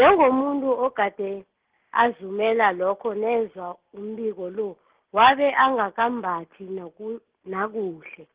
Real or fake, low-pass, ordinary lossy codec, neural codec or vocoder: real; 3.6 kHz; Opus, 16 kbps; none